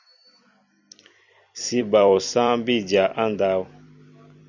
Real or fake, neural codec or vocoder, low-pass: fake; vocoder, 44.1 kHz, 128 mel bands every 256 samples, BigVGAN v2; 7.2 kHz